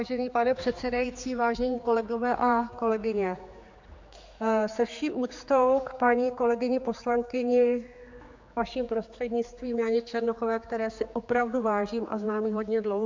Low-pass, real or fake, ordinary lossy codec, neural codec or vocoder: 7.2 kHz; fake; MP3, 64 kbps; codec, 16 kHz, 4 kbps, X-Codec, HuBERT features, trained on general audio